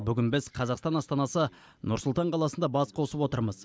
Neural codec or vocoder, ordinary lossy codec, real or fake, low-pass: none; none; real; none